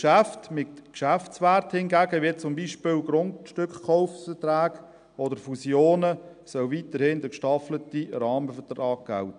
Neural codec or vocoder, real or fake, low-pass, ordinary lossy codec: none; real; 9.9 kHz; none